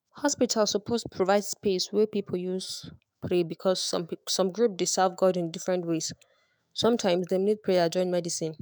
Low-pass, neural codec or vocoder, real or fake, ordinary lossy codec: none; autoencoder, 48 kHz, 128 numbers a frame, DAC-VAE, trained on Japanese speech; fake; none